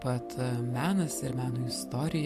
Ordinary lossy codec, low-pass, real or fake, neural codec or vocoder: AAC, 64 kbps; 14.4 kHz; real; none